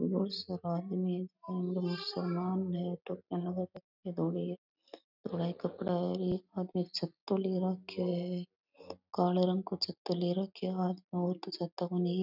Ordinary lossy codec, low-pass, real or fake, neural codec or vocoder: none; 5.4 kHz; real; none